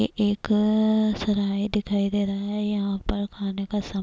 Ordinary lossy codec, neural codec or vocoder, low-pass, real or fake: none; none; none; real